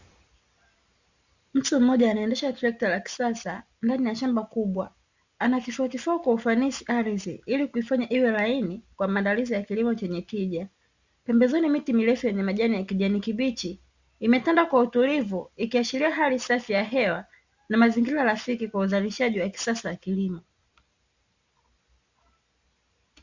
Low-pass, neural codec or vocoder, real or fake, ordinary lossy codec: 7.2 kHz; none; real; Opus, 64 kbps